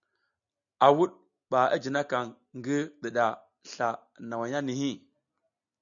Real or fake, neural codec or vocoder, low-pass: real; none; 7.2 kHz